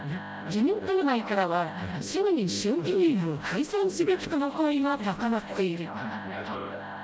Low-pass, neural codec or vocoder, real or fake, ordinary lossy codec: none; codec, 16 kHz, 0.5 kbps, FreqCodec, smaller model; fake; none